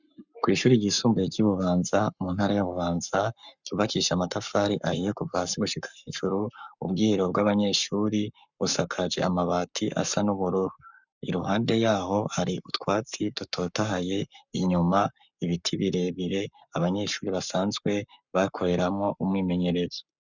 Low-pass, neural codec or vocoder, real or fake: 7.2 kHz; codec, 44.1 kHz, 7.8 kbps, Pupu-Codec; fake